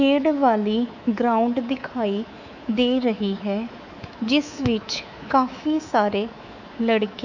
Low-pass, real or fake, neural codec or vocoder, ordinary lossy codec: 7.2 kHz; fake; codec, 24 kHz, 3.1 kbps, DualCodec; none